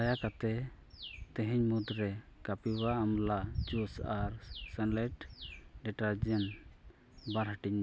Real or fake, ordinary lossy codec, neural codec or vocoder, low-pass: real; none; none; none